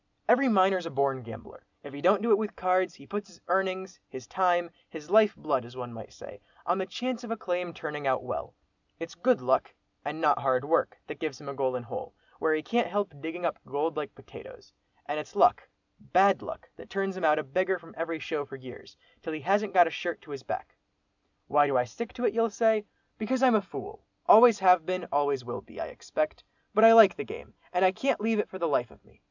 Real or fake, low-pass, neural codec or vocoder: real; 7.2 kHz; none